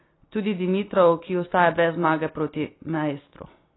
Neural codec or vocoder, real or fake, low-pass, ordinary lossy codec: none; real; 7.2 kHz; AAC, 16 kbps